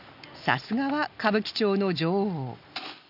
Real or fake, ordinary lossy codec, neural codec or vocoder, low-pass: real; none; none; 5.4 kHz